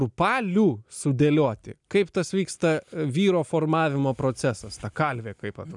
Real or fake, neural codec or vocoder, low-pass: real; none; 10.8 kHz